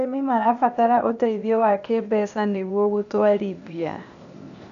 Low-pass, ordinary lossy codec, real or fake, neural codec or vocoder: 7.2 kHz; none; fake; codec, 16 kHz, 0.8 kbps, ZipCodec